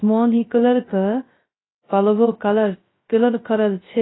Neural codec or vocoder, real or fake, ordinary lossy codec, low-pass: codec, 16 kHz, 0.2 kbps, FocalCodec; fake; AAC, 16 kbps; 7.2 kHz